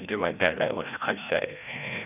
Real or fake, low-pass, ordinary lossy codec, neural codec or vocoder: fake; 3.6 kHz; none; codec, 16 kHz, 1 kbps, FreqCodec, larger model